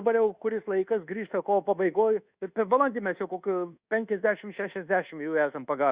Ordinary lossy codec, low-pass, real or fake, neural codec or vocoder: Opus, 64 kbps; 3.6 kHz; fake; codec, 24 kHz, 1.2 kbps, DualCodec